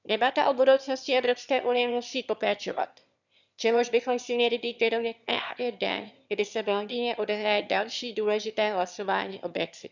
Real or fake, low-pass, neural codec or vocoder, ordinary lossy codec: fake; 7.2 kHz; autoencoder, 22.05 kHz, a latent of 192 numbers a frame, VITS, trained on one speaker; none